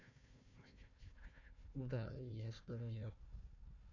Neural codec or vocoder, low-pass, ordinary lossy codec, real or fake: codec, 16 kHz, 1 kbps, FunCodec, trained on Chinese and English, 50 frames a second; 7.2 kHz; none; fake